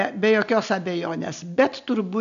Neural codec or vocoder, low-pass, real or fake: none; 7.2 kHz; real